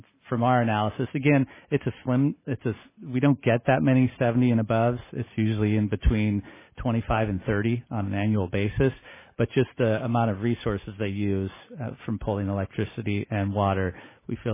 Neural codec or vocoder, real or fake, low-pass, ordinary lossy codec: none; real; 3.6 kHz; MP3, 16 kbps